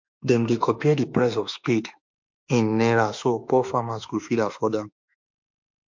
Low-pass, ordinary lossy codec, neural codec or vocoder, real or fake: 7.2 kHz; MP3, 48 kbps; autoencoder, 48 kHz, 32 numbers a frame, DAC-VAE, trained on Japanese speech; fake